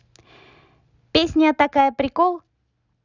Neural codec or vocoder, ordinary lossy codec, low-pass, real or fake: none; none; 7.2 kHz; real